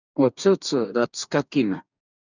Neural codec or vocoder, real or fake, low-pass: codec, 44.1 kHz, 2.6 kbps, DAC; fake; 7.2 kHz